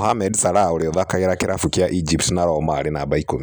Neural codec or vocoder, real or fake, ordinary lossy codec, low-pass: none; real; none; none